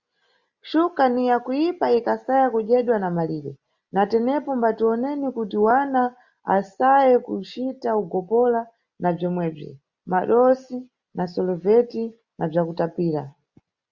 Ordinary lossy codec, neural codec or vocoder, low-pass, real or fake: Opus, 64 kbps; none; 7.2 kHz; real